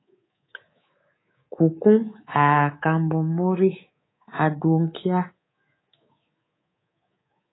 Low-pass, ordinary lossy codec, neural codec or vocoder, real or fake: 7.2 kHz; AAC, 16 kbps; codec, 24 kHz, 3.1 kbps, DualCodec; fake